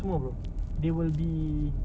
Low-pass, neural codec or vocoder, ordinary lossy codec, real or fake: none; none; none; real